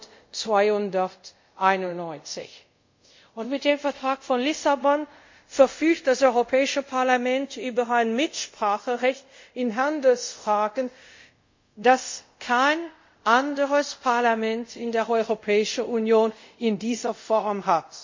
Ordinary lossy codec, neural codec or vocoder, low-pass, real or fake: MP3, 48 kbps; codec, 24 kHz, 0.5 kbps, DualCodec; 7.2 kHz; fake